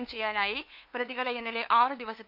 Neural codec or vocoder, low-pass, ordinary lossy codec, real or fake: codec, 16 kHz, 2 kbps, FunCodec, trained on LibriTTS, 25 frames a second; 5.4 kHz; none; fake